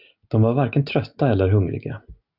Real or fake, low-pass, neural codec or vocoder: real; 5.4 kHz; none